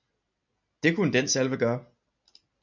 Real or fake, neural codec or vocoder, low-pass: real; none; 7.2 kHz